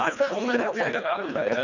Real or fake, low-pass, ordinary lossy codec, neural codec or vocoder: fake; 7.2 kHz; none; codec, 24 kHz, 1.5 kbps, HILCodec